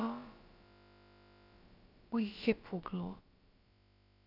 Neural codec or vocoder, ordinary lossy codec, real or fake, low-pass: codec, 16 kHz, about 1 kbps, DyCAST, with the encoder's durations; none; fake; 5.4 kHz